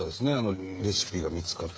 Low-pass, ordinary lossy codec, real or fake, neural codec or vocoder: none; none; fake; codec, 16 kHz, 8 kbps, FreqCodec, smaller model